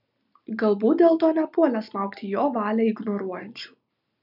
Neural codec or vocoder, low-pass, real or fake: none; 5.4 kHz; real